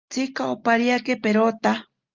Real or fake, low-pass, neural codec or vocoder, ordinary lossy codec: real; 7.2 kHz; none; Opus, 32 kbps